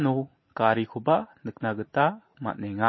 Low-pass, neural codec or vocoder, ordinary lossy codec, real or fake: 7.2 kHz; none; MP3, 24 kbps; real